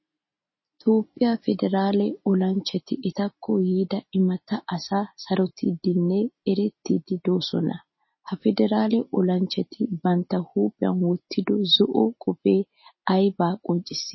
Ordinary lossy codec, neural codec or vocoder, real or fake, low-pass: MP3, 24 kbps; none; real; 7.2 kHz